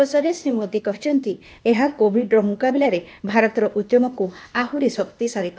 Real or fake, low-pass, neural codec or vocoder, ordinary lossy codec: fake; none; codec, 16 kHz, 0.8 kbps, ZipCodec; none